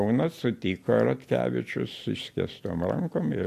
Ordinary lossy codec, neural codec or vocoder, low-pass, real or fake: AAC, 64 kbps; none; 14.4 kHz; real